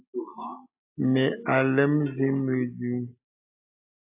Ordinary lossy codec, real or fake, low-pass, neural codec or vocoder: AAC, 32 kbps; real; 3.6 kHz; none